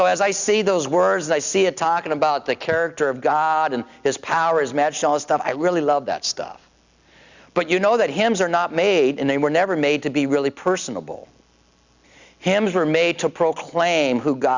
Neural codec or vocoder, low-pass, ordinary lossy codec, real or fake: none; 7.2 kHz; Opus, 64 kbps; real